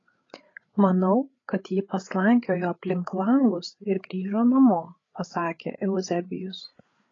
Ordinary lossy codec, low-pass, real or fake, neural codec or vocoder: AAC, 32 kbps; 7.2 kHz; fake; codec, 16 kHz, 8 kbps, FreqCodec, larger model